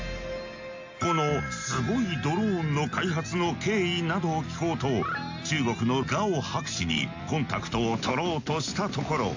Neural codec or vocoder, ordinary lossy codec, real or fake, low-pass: none; AAC, 48 kbps; real; 7.2 kHz